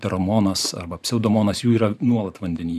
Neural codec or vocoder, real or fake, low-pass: vocoder, 44.1 kHz, 128 mel bands every 512 samples, BigVGAN v2; fake; 14.4 kHz